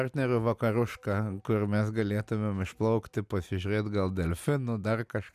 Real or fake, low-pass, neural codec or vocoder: real; 14.4 kHz; none